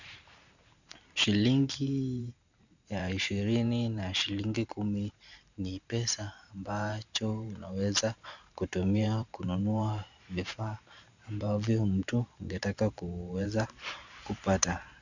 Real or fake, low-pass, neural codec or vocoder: fake; 7.2 kHz; vocoder, 44.1 kHz, 128 mel bands every 512 samples, BigVGAN v2